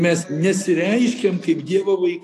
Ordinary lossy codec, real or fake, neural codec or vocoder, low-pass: AAC, 64 kbps; real; none; 14.4 kHz